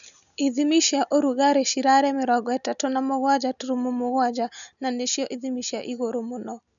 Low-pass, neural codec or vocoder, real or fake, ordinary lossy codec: 7.2 kHz; none; real; none